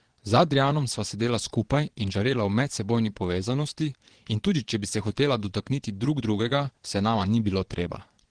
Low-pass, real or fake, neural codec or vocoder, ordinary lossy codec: 9.9 kHz; fake; vocoder, 22.05 kHz, 80 mel bands, Vocos; Opus, 16 kbps